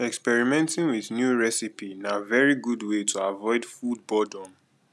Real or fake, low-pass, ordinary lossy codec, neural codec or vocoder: real; none; none; none